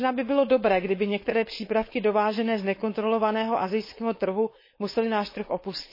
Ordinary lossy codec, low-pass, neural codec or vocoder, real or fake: MP3, 24 kbps; 5.4 kHz; codec, 16 kHz, 4.8 kbps, FACodec; fake